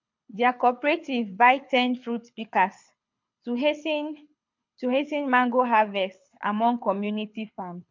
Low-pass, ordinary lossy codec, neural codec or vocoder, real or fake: 7.2 kHz; MP3, 48 kbps; codec, 24 kHz, 6 kbps, HILCodec; fake